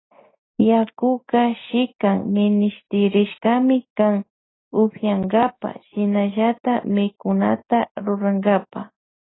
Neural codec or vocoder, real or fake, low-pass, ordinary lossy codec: none; real; 7.2 kHz; AAC, 16 kbps